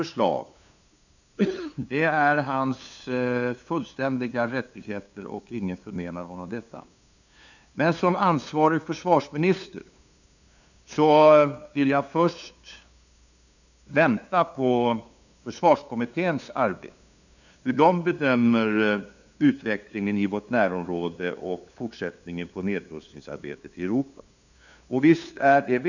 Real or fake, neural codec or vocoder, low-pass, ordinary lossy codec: fake; codec, 16 kHz, 2 kbps, FunCodec, trained on LibriTTS, 25 frames a second; 7.2 kHz; none